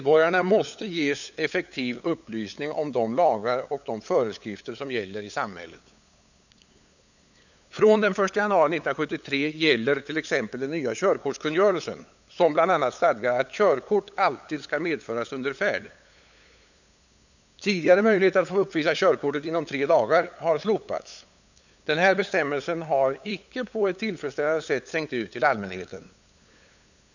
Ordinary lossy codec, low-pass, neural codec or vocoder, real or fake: none; 7.2 kHz; codec, 16 kHz, 8 kbps, FunCodec, trained on LibriTTS, 25 frames a second; fake